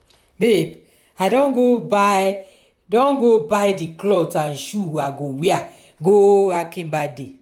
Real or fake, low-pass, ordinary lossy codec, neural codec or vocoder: fake; 19.8 kHz; none; vocoder, 44.1 kHz, 128 mel bands, Pupu-Vocoder